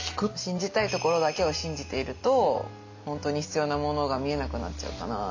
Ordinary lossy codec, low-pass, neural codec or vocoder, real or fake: AAC, 48 kbps; 7.2 kHz; none; real